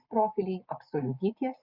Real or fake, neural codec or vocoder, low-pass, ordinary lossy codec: real; none; 5.4 kHz; Opus, 64 kbps